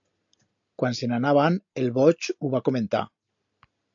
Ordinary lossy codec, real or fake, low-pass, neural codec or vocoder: AAC, 64 kbps; real; 7.2 kHz; none